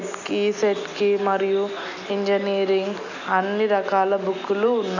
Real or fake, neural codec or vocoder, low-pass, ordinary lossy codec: real; none; 7.2 kHz; none